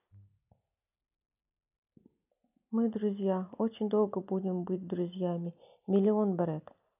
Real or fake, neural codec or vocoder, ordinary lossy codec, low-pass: real; none; none; 3.6 kHz